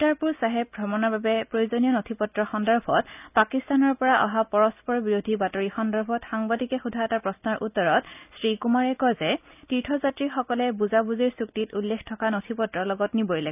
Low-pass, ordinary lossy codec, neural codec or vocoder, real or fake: 3.6 kHz; none; none; real